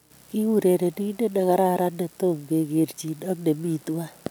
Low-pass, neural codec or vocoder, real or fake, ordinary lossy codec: none; none; real; none